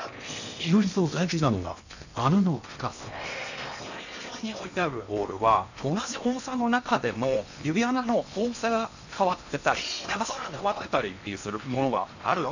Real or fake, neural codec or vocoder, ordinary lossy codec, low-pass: fake; codec, 16 kHz in and 24 kHz out, 0.8 kbps, FocalCodec, streaming, 65536 codes; none; 7.2 kHz